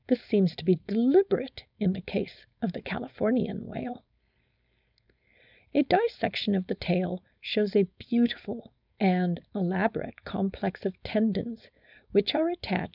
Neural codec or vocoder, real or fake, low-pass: codec, 16 kHz, 4.8 kbps, FACodec; fake; 5.4 kHz